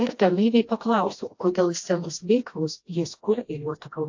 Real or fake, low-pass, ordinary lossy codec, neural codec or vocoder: fake; 7.2 kHz; AAC, 48 kbps; codec, 16 kHz, 1 kbps, FreqCodec, smaller model